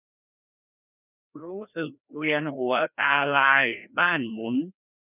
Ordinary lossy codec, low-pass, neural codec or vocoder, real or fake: none; 3.6 kHz; codec, 16 kHz, 1 kbps, FreqCodec, larger model; fake